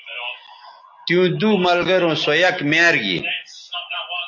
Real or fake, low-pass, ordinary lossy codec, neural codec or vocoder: real; 7.2 kHz; AAC, 48 kbps; none